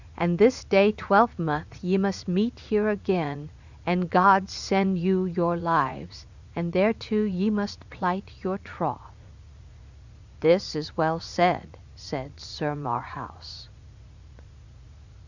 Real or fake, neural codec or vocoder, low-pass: fake; vocoder, 44.1 kHz, 80 mel bands, Vocos; 7.2 kHz